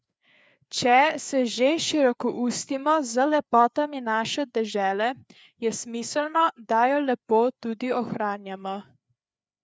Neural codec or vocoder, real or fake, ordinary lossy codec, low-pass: codec, 16 kHz, 4 kbps, FreqCodec, larger model; fake; none; none